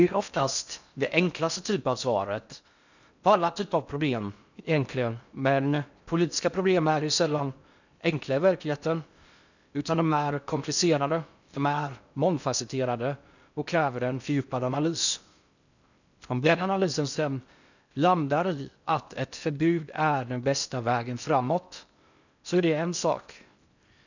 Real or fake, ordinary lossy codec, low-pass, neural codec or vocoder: fake; none; 7.2 kHz; codec, 16 kHz in and 24 kHz out, 0.6 kbps, FocalCodec, streaming, 4096 codes